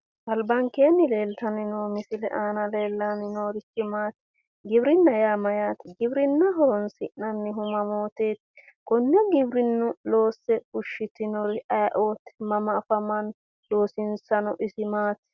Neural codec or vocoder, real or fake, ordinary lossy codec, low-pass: none; real; Opus, 64 kbps; 7.2 kHz